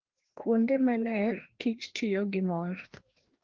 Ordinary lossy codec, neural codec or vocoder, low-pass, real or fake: Opus, 16 kbps; codec, 16 kHz, 1 kbps, FreqCodec, larger model; 7.2 kHz; fake